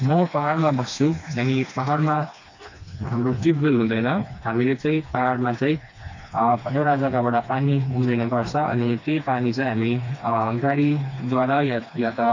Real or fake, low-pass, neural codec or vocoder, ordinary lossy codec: fake; 7.2 kHz; codec, 16 kHz, 2 kbps, FreqCodec, smaller model; AAC, 48 kbps